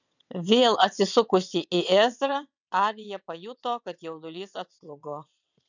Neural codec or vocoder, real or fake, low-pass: vocoder, 22.05 kHz, 80 mel bands, WaveNeXt; fake; 7.2 kHz